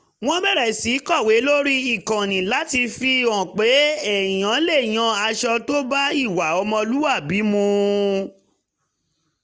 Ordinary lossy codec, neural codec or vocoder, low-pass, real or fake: none; none; none; real